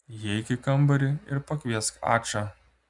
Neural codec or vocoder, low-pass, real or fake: none; 10.8 kHz; real